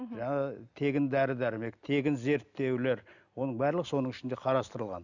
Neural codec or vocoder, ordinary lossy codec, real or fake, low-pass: none; none; real; 7.2 kHz